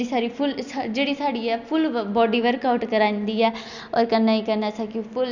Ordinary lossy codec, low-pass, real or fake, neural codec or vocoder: none; 7.2 kHz; real; none